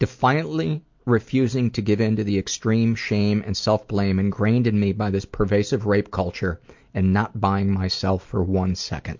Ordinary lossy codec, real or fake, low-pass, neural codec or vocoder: MP3, 48 kbps; real; 7.2 kHz; none